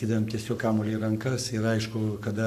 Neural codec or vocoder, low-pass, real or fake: codec, 44.1 kHz, 7.8 kbps, Pupu-Codec; 14.4 kHz; fake